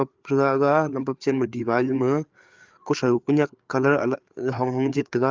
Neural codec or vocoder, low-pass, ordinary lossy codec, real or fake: codec, 16 kHz, 4 kbps, FreqCodec, larger model; 7.2 kHz; Opus, 24 kbps; fake